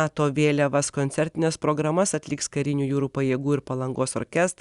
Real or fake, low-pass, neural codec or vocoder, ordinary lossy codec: real; 10.8 kHz; none; Opus, 64 kbps